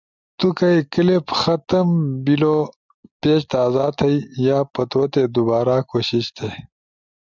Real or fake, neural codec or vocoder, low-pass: real; none; 7.2 kHz